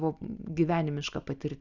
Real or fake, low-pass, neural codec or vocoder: real; 7.2 kHz; none